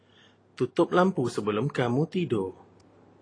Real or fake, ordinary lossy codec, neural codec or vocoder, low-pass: real; AAC, 32 kbps; none; 9.9 kHz